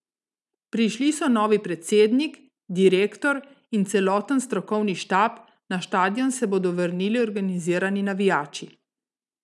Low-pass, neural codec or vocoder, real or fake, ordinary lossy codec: none; none; real; none